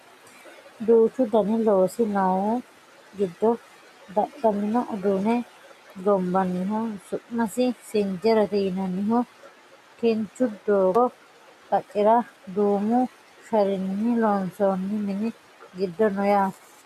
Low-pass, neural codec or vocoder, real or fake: 14.4 kHz; none; real